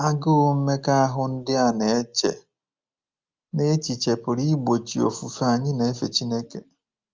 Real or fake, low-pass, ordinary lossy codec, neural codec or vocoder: real; 7.2 kHz; Opus, 32 kbps; none